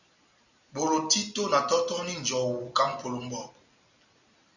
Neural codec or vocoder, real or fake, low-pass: none; real; 7.2 kHz